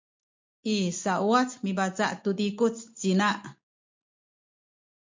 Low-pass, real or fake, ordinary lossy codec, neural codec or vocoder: 7.2 kHz; real; MP3, 48 kbps; none